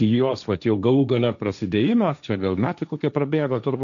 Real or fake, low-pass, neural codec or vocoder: fake; 7.2 kHz; codec, 16 kHz, 1.1 kbps, Voila-Tokenizer